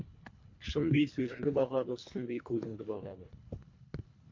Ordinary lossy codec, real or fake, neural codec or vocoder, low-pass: MP3, 48 kbps; fake; codec, 24 kHz, 1.5 kbps, HILCodec; 7.2 kHz